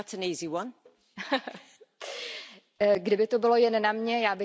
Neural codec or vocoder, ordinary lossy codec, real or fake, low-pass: none; none; real; none